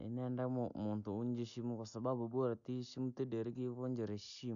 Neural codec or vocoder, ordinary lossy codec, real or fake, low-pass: none; none; real; 7.2 kHz